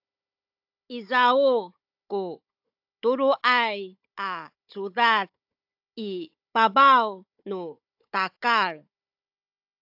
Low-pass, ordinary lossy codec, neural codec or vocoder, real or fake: 5.4 kHz; AAC, 48 kbps; codec, 16 kHz, 16 kbps, FunCodec, trained on Chinese and English, 50 frames a second; fake